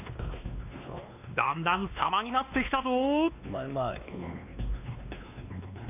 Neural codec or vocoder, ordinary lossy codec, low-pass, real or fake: codec, 16 kHz, 2 kbps, X-Codec, WavLM features, trained on Multilingual LibriSpeech; none; 3.6 kHz; fake